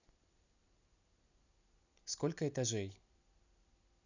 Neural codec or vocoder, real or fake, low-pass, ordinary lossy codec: none; real; 7.2 kHz; none